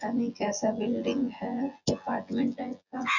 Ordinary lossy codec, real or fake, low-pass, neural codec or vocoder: Opus, 64 kbps; fake; 7.2 kHz; vocoder, 24 kHz, 100 mel bands, Vocos